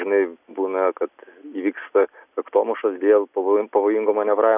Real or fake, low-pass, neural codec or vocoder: real; 3.6 kHz; none